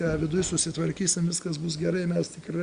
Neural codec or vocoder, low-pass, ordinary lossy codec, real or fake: none; 9.9 kHz; Opus, 64 kbps; real